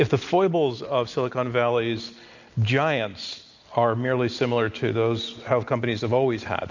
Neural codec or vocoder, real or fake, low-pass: none; real; 7.2 kHz